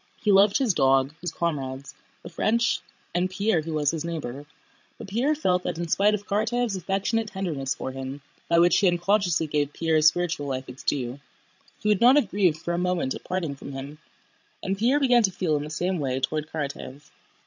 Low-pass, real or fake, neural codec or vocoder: 7.2 kHz; fake; codec, 16 kHz, 16 kbps, FreqCodec, larger model